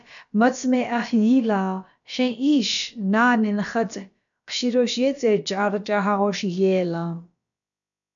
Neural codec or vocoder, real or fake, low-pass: codec, 16 kHz, about 1 kbps, DyCAST, with the encoder's durations; fake; 7.2 kHz